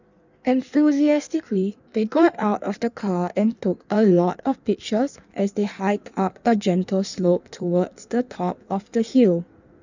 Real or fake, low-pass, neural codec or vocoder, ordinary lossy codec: fake; 7.2 kHz; codec, 16 kHz in and 24 kHz out, 1.1 kbps, FireRedTTS-2 codec; none